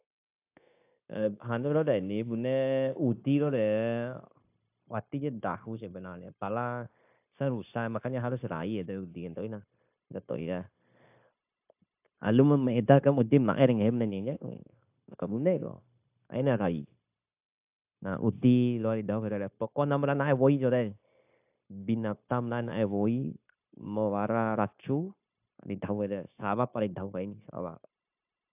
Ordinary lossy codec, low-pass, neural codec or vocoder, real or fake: AAC, 32 kbps; 3.6 kHz; codec, 16 kHz, 0.9 kbps, LongCat-Audio-Codec; fake